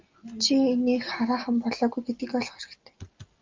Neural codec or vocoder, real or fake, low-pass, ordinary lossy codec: none; real; 7.2 kHz; Opus, 24 kbps